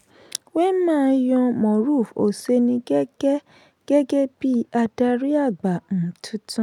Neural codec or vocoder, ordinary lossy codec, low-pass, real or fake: none; none; 19.8 kHz; real